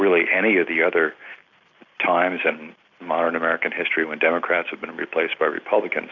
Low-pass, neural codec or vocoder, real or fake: 7.2 kHz; none; real